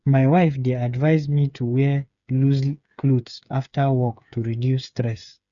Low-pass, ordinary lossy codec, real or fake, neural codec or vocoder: 7.2 kHz; none; fake; codec, 16 kHz, 8 kbps, FreqCodec, smaller model